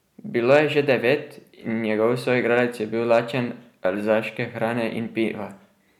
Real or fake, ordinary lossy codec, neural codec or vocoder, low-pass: real; none; none; 19.8 kHz